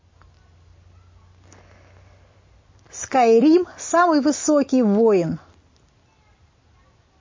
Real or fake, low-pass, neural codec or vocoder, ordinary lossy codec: real; 7.2 kHz; none; MP3, 32 kbps